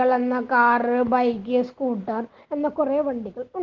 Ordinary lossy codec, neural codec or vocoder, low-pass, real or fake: Opus, 16 kbps; none; 7.2 kHz; real